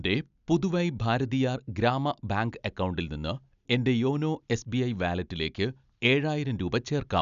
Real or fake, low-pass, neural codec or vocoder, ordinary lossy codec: real; 7.2 kHz; none; none